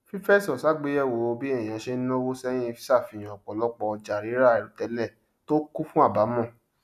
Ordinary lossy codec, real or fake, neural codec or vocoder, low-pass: none; real; none; 14.4 kHz